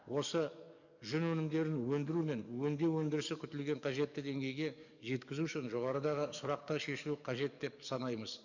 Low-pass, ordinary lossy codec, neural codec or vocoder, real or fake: 7.2 kHz; none; codec, 44.1 kHz, 7.8 kbps, DAC; fake